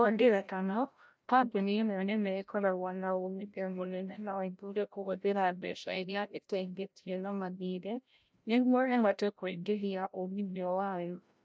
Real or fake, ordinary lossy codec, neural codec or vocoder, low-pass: fake; none; codec, 16 kHz, 0.5 kbps, FreqCodec, larger model; none